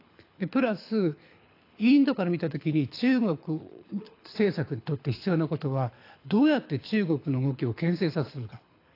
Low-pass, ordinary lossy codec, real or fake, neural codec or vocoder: 5.4 kHz; AAC, 32 kbps; fake; codec, 24 kHz, 6 kbps, HILCodec